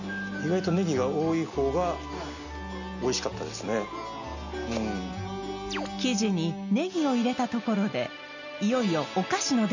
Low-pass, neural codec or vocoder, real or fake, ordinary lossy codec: 7.2 kHz; none; real; none